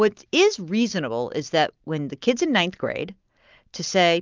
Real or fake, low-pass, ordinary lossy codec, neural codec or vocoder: real; 7.2 kHz; Opus, 24 kbps; none